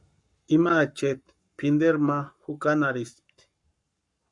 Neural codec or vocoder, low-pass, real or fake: codec, 44.1 kHz, 7.8 kbps, Pupu-Codec; 10.8 kHz; fake